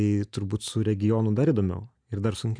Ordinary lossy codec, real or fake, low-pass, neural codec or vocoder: MP3, 96 kbps; real; 9.9 kHz; none